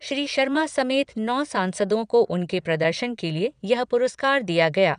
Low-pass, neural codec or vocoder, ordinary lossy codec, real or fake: 9.9 kHz; vocoder, 22.05 kHz, 80 mel bands, Vocos; none; fake